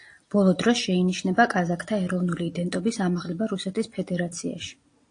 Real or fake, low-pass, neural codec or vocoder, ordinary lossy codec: real; 9.9 kHz; none; AAC, 48 kbps